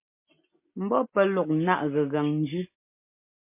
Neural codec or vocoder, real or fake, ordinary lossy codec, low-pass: none; real; MP3, 24 kbps; 3.6 kHz